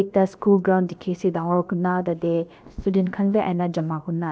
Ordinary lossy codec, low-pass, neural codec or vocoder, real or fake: none; none; codec, 16 kHz, about 1 kbps, DyCAST, with the encoder's durations; fake